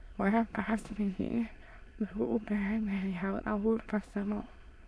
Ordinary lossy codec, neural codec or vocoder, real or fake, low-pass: none; autoencoder, 22.05 kHz, a latent of 192 numbers a frame, VITS, trained on many speakers; fake; none